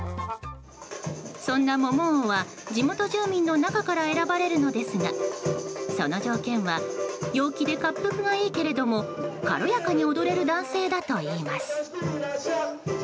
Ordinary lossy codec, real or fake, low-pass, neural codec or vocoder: none; real; none; none